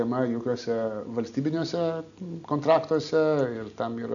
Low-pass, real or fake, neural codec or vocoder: 7.2 kHz; real; none